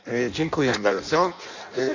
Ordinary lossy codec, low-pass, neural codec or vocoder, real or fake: none; 7.2 kHz; codec, 16 kHz in and 24 kHz out, 0.6 kbps, FireRedTTS-2 codec; fake